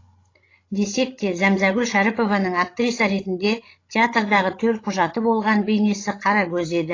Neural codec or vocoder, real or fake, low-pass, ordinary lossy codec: codec, 16 kHz, 16 kbps, FreqCodec, larger model; fake; 7.2 kHz; AAC, 32 kbps